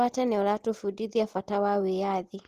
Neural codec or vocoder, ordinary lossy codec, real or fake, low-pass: none; Opus, 16 kbps; real; 14.4 kHz